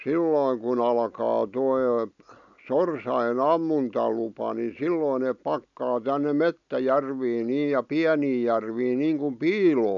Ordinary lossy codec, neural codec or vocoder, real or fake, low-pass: none; none; real; 7.2 kHz